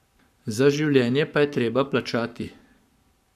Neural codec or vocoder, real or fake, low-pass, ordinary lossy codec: codec, 44.1 kHz, 7.8 kbps, Pupu-Codec; fake; 14.4 kHz; none